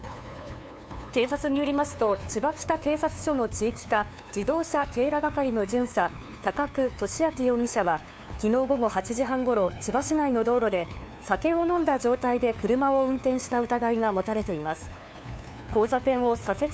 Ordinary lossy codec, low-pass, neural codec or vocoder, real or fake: none; none; codec, 16 kHz, 2 kbps, FunCodec, trained on LibriTTS, 25 frames a second; fake